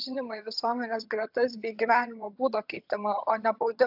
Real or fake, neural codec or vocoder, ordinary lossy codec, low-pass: fake; vocoder, 22.05 kHz, 80 mel bands, HiFi-GAN; AAC, 48 kbps; 5.4 kHz